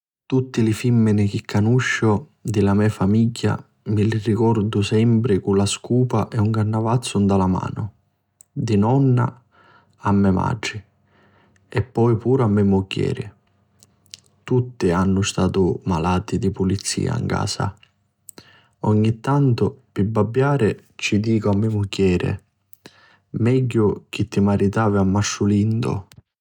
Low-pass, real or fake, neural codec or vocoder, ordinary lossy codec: 19.8 kHz; real; none; none